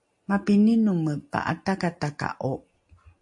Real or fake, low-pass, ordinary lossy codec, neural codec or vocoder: real; 10.8 kHz; MP3, 48 kbps; none